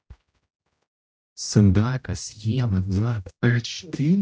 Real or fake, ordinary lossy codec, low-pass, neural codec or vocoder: fake; none; none; codec, 16 kHz, 0.5 kbps, X-Codec, HuBERT features, trained on general audio